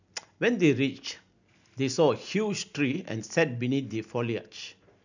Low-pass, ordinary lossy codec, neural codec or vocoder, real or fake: 7.2 kHz; none; none; real